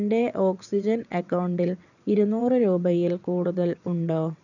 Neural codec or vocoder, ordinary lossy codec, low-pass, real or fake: vocoder, 22.05 kHz, 80 mel bands, WaveNeXt; none; 7.2 kHz; fake